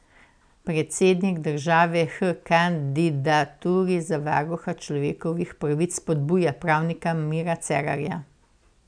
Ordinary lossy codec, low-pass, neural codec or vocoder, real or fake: none; 9.9 kHz; none; real